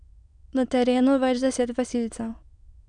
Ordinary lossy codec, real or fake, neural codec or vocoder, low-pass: none; fake; autoencoder, 22.05 kHz, a latent of 192 numbers a frame, VITS, trained on many speakers; 9.9 kHz